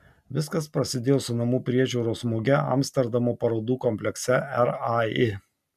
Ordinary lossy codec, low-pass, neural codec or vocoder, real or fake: MP3, 96 kbps; 14.4 kHz; none; real